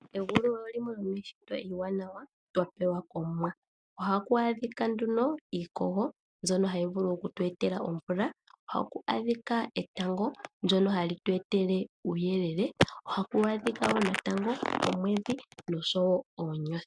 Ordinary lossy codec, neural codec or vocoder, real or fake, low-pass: MP3, 96 kbps; none; real; 9.9 kHz